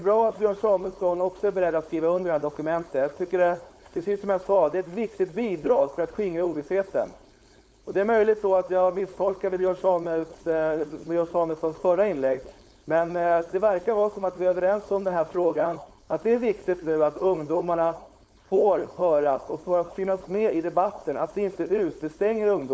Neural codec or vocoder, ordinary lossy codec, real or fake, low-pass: codec, 16 kHz, 4.8 kbps, FACodec; none; fake; none